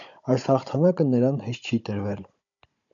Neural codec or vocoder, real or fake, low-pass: codec, 16 kHz, 16 kbps, FunCodec, trained on Chinese and English, 50 frames a second; fake; 7.2 kHz